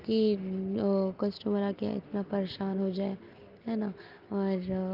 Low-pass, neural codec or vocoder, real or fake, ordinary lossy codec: 5.4 kHz; none; real; Opus, 32 kbps